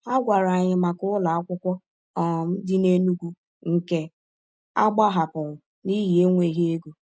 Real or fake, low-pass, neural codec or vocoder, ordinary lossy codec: real; none; none; none